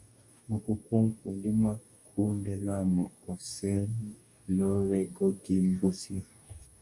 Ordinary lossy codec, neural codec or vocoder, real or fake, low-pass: MP3, 96 kbps; codec, 44.1 kHz, 2.6 kbps, DAC; fake; 10.8 kHz